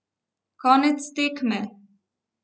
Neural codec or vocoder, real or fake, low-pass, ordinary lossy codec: none; real; none; none